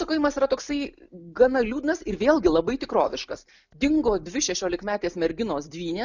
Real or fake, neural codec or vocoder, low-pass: real; none; 7.2 kHz